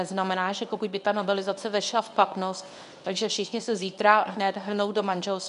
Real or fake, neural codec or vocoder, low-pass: fake; codec, 24 kHz, 0.9 kbps, WavTokenizer, medium speech release version 1; 10.8 kHz